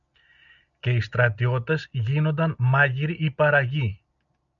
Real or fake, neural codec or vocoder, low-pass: real; none; 7.2 kHz